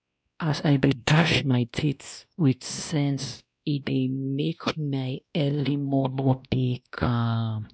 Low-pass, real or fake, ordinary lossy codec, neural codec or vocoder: none; fake; none; codec, 16 kHz, 1 kbps, X-Codec, WavLM features, trained on Multilingual LibriSpeech